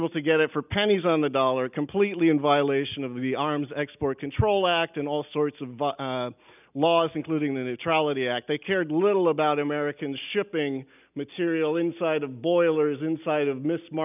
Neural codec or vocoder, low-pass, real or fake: none; 3.6 kHz; real